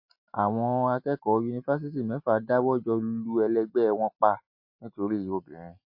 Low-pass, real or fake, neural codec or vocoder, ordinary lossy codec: 5.4 kHz; real; none; MP3, 32 kbps